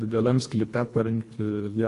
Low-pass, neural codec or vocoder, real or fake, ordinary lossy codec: 10.8 kHz; codec, 24 kHz, 1.5 kbps, HILCodec; fake; AAC, 48 kbps